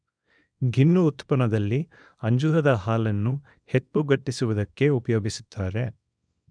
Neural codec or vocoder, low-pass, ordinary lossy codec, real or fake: codec, 24 kHz, 0.9 kbps, WavTokenizer, small release; 9.9 kHz; none; fake